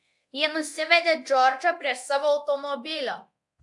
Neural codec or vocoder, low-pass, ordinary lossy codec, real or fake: codec, 24 kHz, 0.9 kbps, DualCodec; 10.8 kHz; AAC, 64 kbps; fake